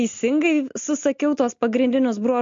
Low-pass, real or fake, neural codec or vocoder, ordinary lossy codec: 7.2 kHz; real; none; MP3, 48 kbps